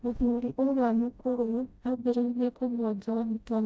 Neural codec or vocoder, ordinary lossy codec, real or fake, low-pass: codec, 16 kHz, 0.5 kbps, FreqCodec, smaller model; none; fake; none